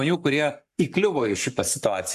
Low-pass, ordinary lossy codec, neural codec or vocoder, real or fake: 14.4 kHz; MP3, 96 kbps; codec, 44.1 kHz, 7.8 kbps, Pupu-Codec; fake